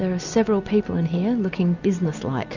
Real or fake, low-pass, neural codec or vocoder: real; 7.2 kHz; none